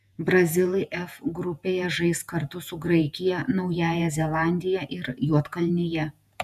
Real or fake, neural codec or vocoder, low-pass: fake; vocoder, 48 kHz, 128 mel bands, Vocos; 14.4 kHz